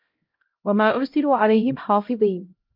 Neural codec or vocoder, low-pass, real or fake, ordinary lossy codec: codec, 16 kHz, 0.5 kbps, X-Codec, HuBERT features, trained on LibriSpeech; 5.4 kHz; fake; Opus, 24 kbps